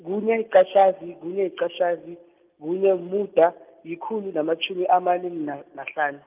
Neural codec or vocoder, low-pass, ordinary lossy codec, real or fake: none; 3.6 kHz; Opus, 32 kbps; real